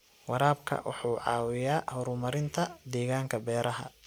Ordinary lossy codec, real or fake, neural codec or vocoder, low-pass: none; real; none; none